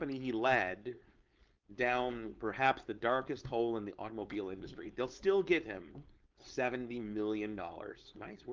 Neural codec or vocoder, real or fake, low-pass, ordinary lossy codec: codec, 16 kHz, 4.8 kbps, FACodec; fake; 7.2 kHz; Opus, 24 kbps